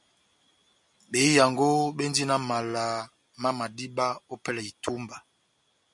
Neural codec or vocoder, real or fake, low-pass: none; real; 10.8 kHz